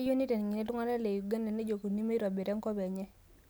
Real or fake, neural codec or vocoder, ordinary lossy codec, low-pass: real; none; none; none